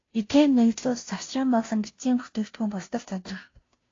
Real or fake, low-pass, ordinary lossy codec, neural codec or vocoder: fake; 7.2 kHz; AAC, 32 kbps; codec, 16 kHz, 0.5 kbps, FunCodec, trained on Chinese and English, 25 frames a second